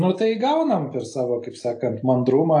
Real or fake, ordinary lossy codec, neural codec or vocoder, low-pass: real; AAC, 48 kbps; none; 10.8 kHz